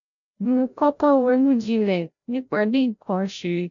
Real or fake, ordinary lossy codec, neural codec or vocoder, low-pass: fake; none; codec, 16 kHz, 0.5 kbps, FreqCodec, larger model; 7.2 kHz